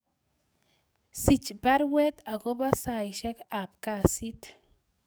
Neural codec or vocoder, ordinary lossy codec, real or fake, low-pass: codec, 44.1 kHz, 7.8 kbps, DAC; none; fake; none